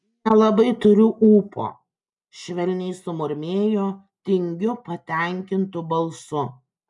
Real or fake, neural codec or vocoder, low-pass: real; none; 10.8 kHz